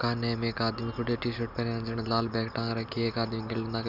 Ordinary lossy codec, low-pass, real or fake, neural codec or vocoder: none; 5.4 kHz; real; none